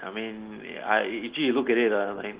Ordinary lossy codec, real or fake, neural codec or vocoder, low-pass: Opus, 16 kbps; real; none; 3.6 kHz